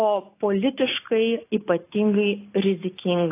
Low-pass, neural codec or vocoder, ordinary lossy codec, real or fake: 3.6 kHz; none; AAC, 24 kbps; real